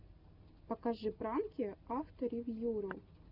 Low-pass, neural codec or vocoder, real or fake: 5.4 kHz; none; real